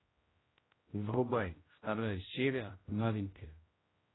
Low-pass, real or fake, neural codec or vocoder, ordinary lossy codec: 7.2 kHz; fake; codec, 16 kHz, 0.5 kbps, X-Codec, HuBERT features, trained on general audio; AAC, 16 kbps